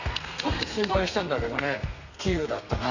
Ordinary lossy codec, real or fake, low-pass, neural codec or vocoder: none; fake; 7.2 kHz; codec, 32 kHz, 1.9 kbps, SNAC